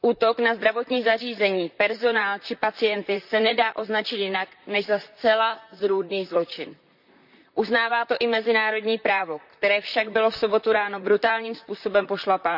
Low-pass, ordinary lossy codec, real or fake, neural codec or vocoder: 5.4 kHz; none; fake; vocoder, 44.1 kHz, 128 mel bands, Pupu-Vocoder